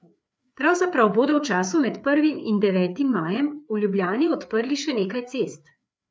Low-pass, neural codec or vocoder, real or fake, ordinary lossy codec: none; codec, 16 kHz, 4 kbps, FreqCodec, larger model; fake; none